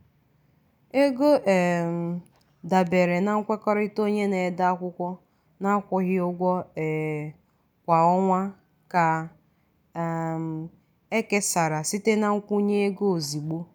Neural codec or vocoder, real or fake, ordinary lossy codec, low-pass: none; real; none; none